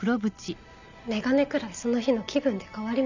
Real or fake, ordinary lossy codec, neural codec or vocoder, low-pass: real; none; none; 7.2 kHz